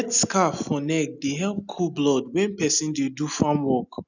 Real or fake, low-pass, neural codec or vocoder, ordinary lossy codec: real; 7.2 kHz; none; none